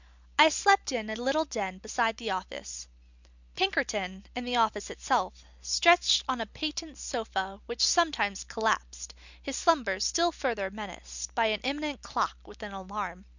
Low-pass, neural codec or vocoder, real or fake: 7.2 kHz; none; real